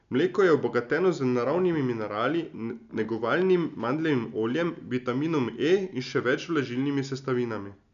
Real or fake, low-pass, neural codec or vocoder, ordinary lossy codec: real; 7.2 kHz; none; none